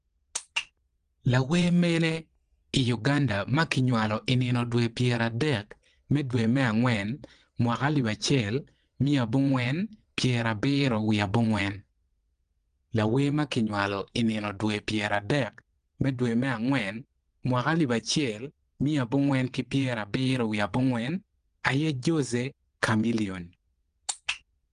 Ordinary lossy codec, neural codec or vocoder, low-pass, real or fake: Opus, 24 kbps; vocoder, 22.05 kHz, 80 mel bands, WaveNeXt; 9.9 kHz; fake